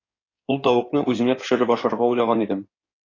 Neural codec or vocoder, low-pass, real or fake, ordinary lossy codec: codec, 16 kHz in and 24 kHz out, 2.2 kbps, FireRedTTS-2 codec; 7.2 kHz; fake; AAC, 32 kbps